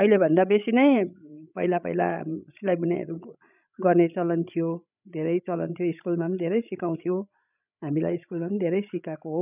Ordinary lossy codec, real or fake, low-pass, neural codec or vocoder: none; fake; 3.6 kHz; codec, 16 kHz, 16 kbps, FreqCodec, larger model